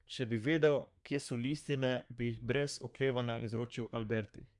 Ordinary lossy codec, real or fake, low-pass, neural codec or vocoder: none; fake; 10.8 kHz; codec, 24 kHz, 1 kbps, SNAC